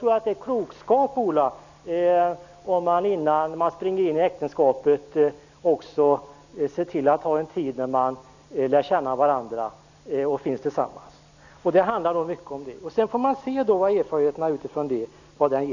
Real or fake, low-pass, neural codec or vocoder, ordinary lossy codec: real; 7.2 kHz; none; none